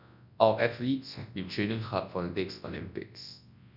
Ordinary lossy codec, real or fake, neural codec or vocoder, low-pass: none; fake; codec, 24 kHz, 0.9 kbps, WavTokenizer, large speech release; 5.4 kHz